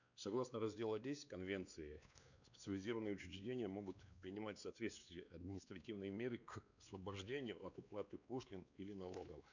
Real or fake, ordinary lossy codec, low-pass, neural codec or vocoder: fake; none; 7.2 kHz; codec, 16 kHz, 2 kbps, X-Codec, WavLM features, trained on Multilingual LibriSpeech